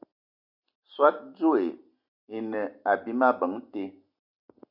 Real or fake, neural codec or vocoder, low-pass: real; none; 5.4 kHz